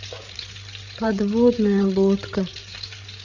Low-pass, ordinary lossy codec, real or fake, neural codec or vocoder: 7.2 kHz; none; fake; codec, 16 kHz, 8 kbps, FreqCodec, larger model